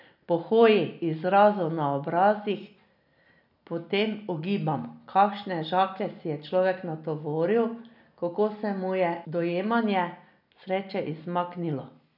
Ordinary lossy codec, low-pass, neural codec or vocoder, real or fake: none; 5.4 kHz; none; real